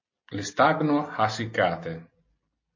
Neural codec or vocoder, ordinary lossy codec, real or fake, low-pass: none; MP3, 32 kbps; real; 7.2 kHz